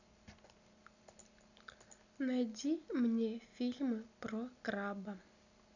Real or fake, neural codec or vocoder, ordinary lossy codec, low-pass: real; none; none; 7.2 kHz